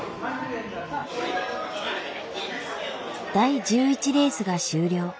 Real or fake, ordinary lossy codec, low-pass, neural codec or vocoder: real; none; none; none